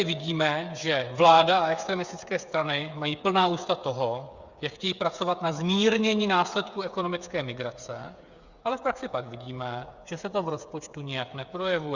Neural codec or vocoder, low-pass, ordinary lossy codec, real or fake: codec, 16 kHz, 8 kbps, FreqCodec, smaller model; 7.2 kHz; Opus, 64 kbps; fake